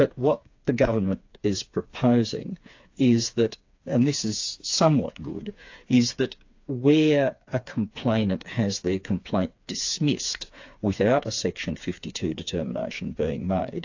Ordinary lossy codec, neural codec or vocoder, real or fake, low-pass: AAC, 48 kbps; codec, 16 kHz, 4 kbps, FreqCodec, smaller model; fake; 7.2 kHz